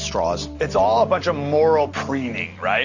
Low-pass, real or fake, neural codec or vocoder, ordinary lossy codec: 7.2 kHz; real; none; Opus, 64 kbps